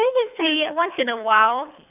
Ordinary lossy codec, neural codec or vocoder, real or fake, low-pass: none; codec, 24 kHz, 3 kbps, HILCodec; fake; 3.6 kHz